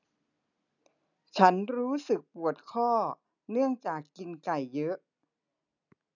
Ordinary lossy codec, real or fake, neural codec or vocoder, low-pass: none; real; none; 7.2 kHz